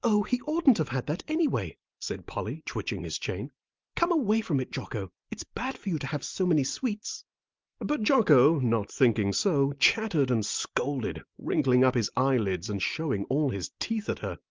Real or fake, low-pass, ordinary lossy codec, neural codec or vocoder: real; 7.2 kHz; Opus, 32 kbps; none